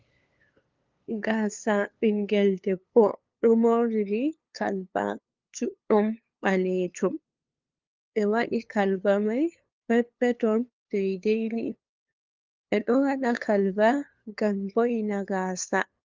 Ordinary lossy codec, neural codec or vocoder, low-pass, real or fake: Opus, 24 kbps; codec, 16 kHz, 2 kbps, FunCodec, trained on LibriTTS, 25 frames a second; 7.2 kHz; fake